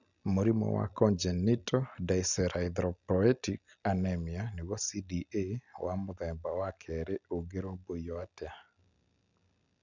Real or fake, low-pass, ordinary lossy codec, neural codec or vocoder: real; 7.2 kHz; none; none